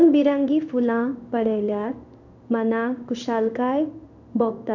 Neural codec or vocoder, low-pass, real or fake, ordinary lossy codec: codec, 16 kHz in and 24 kHz out, 1 kbps, XY-Tokenizer; 7.2 kHz; fake; none